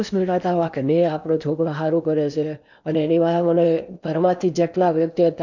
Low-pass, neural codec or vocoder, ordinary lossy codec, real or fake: 7.2 kHz; codec, 16 kHz in and 24 kHz out, 0.8 kbps, FocalCodec, streaming, 65536 codes; none; fake